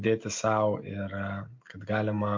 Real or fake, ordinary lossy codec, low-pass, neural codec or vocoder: real; MP3, 48 kbps; 7.2 kHz; none